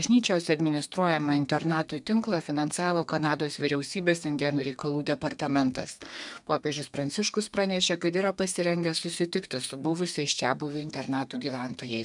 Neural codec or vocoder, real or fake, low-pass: codec, 32 kHz, 1.9 kbps, SNAC; fake; 10.8 kHz